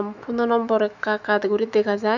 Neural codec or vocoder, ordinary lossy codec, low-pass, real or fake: autoencoder, 48 kHz, 128 numbers a frame, DAC-VAE, trained on Japanese speech; none; 7.2 kHz; fake